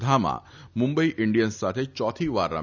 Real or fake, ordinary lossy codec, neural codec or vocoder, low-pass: real; none; none; 7.2 kHz